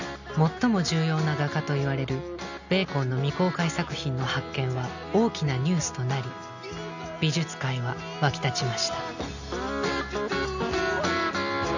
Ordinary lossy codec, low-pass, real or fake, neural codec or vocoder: none; 7.2 kHz; real; none